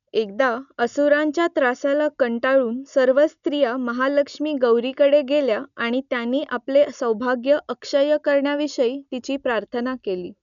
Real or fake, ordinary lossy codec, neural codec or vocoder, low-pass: real; none; none; 7.2 kHz